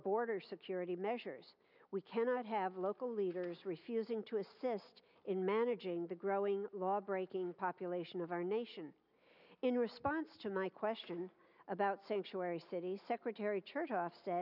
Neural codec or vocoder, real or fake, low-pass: none; real; 5.4 kHz